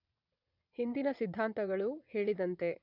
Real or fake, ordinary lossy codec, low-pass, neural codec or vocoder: fake; none; 5.4 kHz; vocoder, 22.05 kHz, 80 mel bands, WaveNeXt